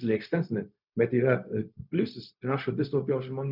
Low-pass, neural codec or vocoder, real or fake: 5.4 kHz; codec, 16 kHz, 0.4 kbps, LongCat-Audio-Codec; fake